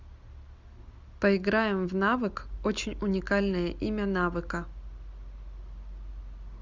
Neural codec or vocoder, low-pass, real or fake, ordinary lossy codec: none; 7.2 kHz; real; Opus, 64 kbps